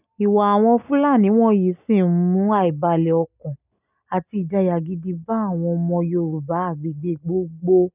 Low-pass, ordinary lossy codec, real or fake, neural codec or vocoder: 3.6 kHz; none; real; none